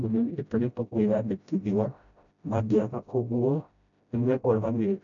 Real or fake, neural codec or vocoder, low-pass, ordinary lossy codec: fake; codec, 16 kHz, 0.5 kbps, FreqCodec, smaller model; 7.2 kHz; none